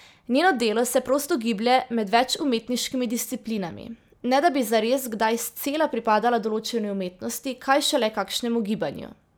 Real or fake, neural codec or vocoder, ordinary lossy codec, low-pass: real; none; none; none